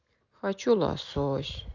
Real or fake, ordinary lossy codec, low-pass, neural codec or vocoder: real; none; 7.2 kHz; none